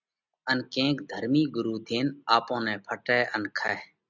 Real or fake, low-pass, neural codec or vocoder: real; 7.2 kHz; none